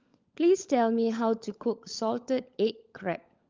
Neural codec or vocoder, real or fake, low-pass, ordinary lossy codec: codec, 16 kHz, 16 kbps, FunCodec, trained on LibriTTS, 50 frames a second; fake; 7.2 kHz; Opus, 32 kbps